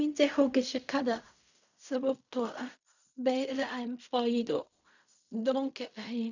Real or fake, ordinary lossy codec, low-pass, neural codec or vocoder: fake; none; 7.2 kHz; codec, 16 kHz in and 24 kHz out, 0.4 kbps, LongCat-Audio-Codec, fine tuned four codebook decoder